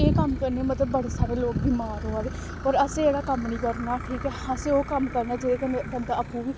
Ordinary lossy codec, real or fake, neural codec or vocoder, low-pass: none; real; none; none